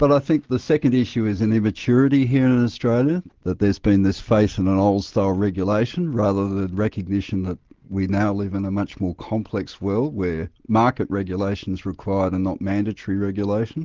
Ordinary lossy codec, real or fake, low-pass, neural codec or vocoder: Opus, 16 kbps; real; 7.2 kHz; none